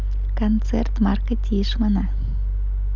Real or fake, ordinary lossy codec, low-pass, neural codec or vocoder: real; none; 7.2 kHz; none